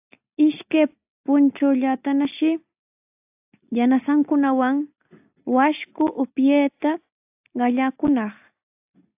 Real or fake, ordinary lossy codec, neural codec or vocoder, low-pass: real; AAC, 32 kbps; none; 3.6 kHz